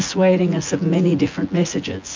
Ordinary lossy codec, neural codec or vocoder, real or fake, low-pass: MP3, 48 kbps; vocoder, 24 kHz, 100 mel bands, Vocos; fake; 7.2 kHz